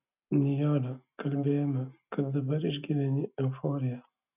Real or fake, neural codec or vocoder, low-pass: real; none; 3.6 kHz